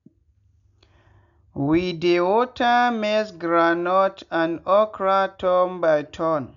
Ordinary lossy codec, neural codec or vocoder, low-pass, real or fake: MP3, 96 kbps; none; 7.2 kHz; real